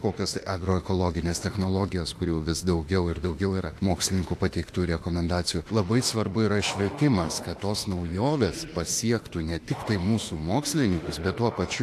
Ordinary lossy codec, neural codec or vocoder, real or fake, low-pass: AAC, 64 kbps; autoencoder, 48 kHz, 32 numbers a frame, DAC-VAE, trained on Japanese speech; fake; 14.4 kHz